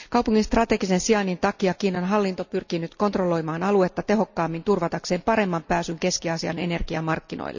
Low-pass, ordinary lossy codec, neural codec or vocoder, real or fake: 7.2 kHz; none; none; real